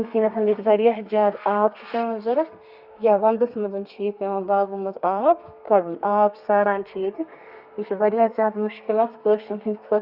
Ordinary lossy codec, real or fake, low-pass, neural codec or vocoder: Opus, 64 kbps; fake; 5.4 kHz; codec, 32 kHz, 1.9 kbps, SNAC